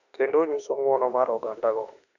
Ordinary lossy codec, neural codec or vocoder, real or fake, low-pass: none; autoencoder, 48 kHz, 32 numbers a frame, DAC-VAE, trained on Japanese speech; fake; 7.2 kHz